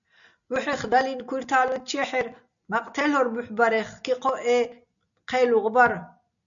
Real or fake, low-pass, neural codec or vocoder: real; 7.2 kHz; none